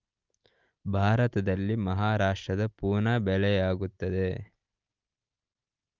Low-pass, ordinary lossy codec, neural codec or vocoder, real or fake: 7.2 kHz; Opus, 32 kbps; none; real